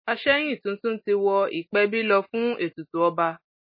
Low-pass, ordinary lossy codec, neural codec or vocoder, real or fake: 5.4 kHz; MP3, 24 kbps; none; real